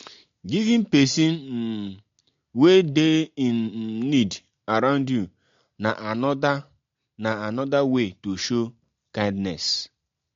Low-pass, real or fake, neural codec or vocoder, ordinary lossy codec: 7.2 kHz; real; none; MP3, 48 kbps